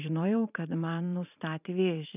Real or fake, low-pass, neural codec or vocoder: fake; 3.6 kHz; vocoder, 44.1 kHz, 128 mel bands every 512 samples, BigVGAN v2